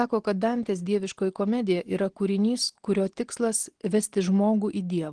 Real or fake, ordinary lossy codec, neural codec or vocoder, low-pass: real; Opus, 16 kbps; none; 9.9 kHz